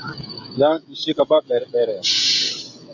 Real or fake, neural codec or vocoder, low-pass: fake; codec, 16 kHz, 16 kbps, FreqCodec, smaller model; 7.2 kHz